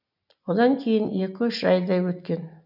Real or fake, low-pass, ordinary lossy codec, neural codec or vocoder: real; 5.4 kHz; none; none